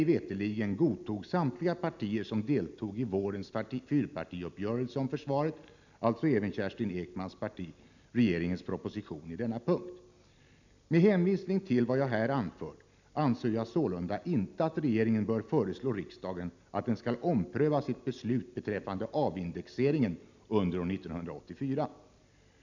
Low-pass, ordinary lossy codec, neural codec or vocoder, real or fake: 7.2 kHz; none; none; real